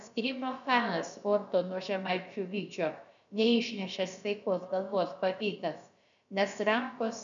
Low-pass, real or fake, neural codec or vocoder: 7.2 kHz; fake; codec, 16 kHz, about 1 kbps, DyCAST, with the encoder's durations